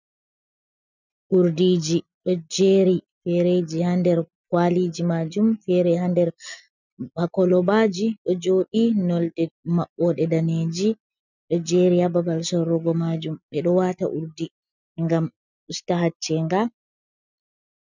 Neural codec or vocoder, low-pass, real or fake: none; 7.2 kHz; real